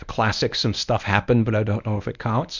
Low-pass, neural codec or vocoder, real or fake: 7.2 kHz; codec, 24 kHz, 0.9 kbps, WavTokenizer, medium speech release version 1; fake